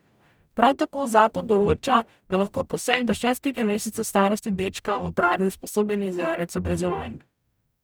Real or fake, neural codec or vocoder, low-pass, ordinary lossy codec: fake; codec, 44.1 kHz, 0.9 kbps, DAC; none; none